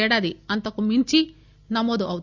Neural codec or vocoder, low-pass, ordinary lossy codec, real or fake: none; 7.2 kHz; Opus, 64 kbps; real